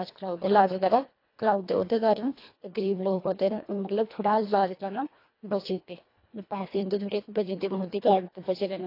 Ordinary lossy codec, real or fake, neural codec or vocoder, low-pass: AAC, 32 kbps; fake; codec, 24 kHz, 1.5 kbps, HILCodec; 5.4 kHz